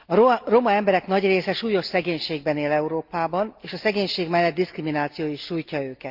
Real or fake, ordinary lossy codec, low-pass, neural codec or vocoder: real; Opus, 32 kbps; 5.4 kHz; none